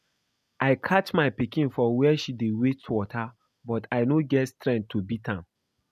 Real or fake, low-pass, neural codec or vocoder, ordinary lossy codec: real; 14.4 kHz; none; none